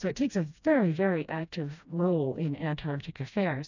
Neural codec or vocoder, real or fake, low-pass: codec, 16 kHz, 1 kbps, FreqCodec, smaller model; fake; 7.2 kHz